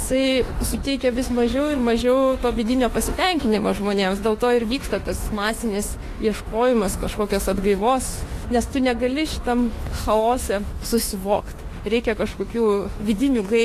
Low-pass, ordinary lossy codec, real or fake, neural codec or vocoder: 14.4 kHz; AAC, 48 kbps; fake; autoencoder, 48 kHz, 32 numbers a frame, DAC-VAE, trained on Japanese speech